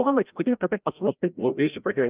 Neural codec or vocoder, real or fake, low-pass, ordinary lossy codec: codec, 16 kHz, 0.5 kbps, FreqCodec, larger model; fake; 3.6 kHz; Opus, 32 kbps